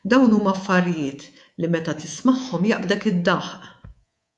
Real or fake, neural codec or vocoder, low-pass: fake; codec, 24 kHz, 3.1 kbps, DualCodec; 10.8 kHz